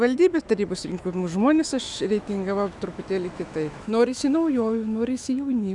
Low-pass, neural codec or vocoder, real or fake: 10.8 kHz; autoencoder, 48 kHz, 128 numbers a frame, DAC-VAE, trained on Japanese speech; fake